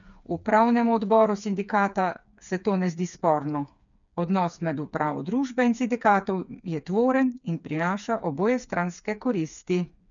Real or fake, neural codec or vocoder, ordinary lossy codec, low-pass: fake; codec, 16 kHz, 4 kbps, FreqCodec, smaller model; none; 7.2 kHz